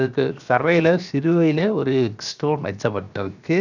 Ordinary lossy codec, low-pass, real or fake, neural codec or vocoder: none; 7.2 kHz; fake; codec, 16 kHz, 0.7 kbps, FocalCodec